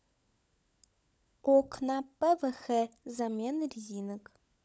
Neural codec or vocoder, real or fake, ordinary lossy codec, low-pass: codec, 16 kHz, 16 kbps, FunCodec, trained on LibriTTS, 50 frames a second; fake; none; none